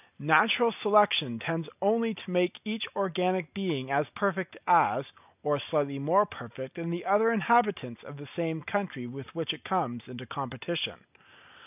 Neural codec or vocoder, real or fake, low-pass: none; real; 3.6 kHz